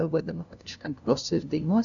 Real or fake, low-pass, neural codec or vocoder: fake; 7.2 kHz; codec, 16 kHz, 0.5 kbps, FunCodec, trained on LibriTTS, 25 frames a second